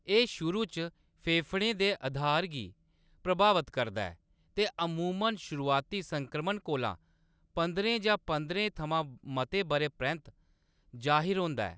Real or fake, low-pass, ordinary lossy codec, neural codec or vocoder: real; none; none; none